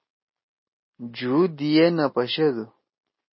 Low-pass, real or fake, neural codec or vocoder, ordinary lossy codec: 7.2 kHz; real; none; MP3, 24 kbps